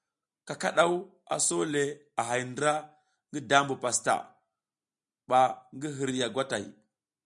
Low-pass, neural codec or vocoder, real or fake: 10.8 kHz; none; real